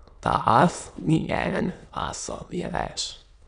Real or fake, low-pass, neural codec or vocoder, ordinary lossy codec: fake; 9.9 kHz; autoencoder, 22.05 kHz, a latent of 192 numbers a frame, VITS, trained on many speakers; none